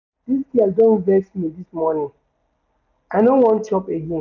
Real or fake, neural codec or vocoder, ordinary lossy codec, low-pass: real; none; none; 7.2 kHz